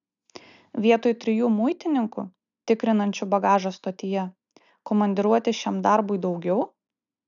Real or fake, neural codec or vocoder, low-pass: real; none; 7.2 kHz